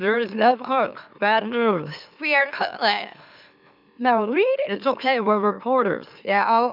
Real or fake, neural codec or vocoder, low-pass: fake; autoencoder, 44.1 kHz, a latent of 192 numbers a frame, MeloTTS; 5.4 kHz